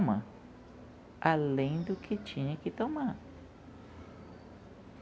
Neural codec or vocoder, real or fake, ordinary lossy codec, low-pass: none; real; none; none